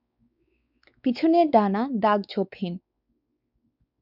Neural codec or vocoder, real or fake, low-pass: codec, 16 kHz, 4 kbps, X-Codec, WavLM features, trained on Multilingual LibriSpeech; fake; 5.4 kHz